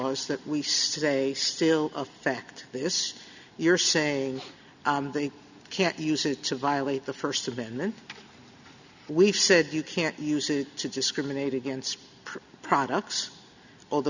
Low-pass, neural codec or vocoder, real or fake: 7.2 kHz; none; real